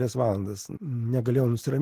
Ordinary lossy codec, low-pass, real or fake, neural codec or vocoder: Opus, 16 kbps; 14.4 kHz; real; none